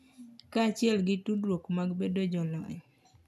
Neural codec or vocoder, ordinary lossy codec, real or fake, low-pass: none; none; real; 14.4 kHz